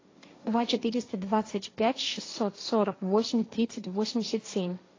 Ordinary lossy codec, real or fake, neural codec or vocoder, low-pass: AAC, 32 kbps; fake; codec, 16 kHz, 1.1 kbps, Voila-Tokenizer; 7.2 kHz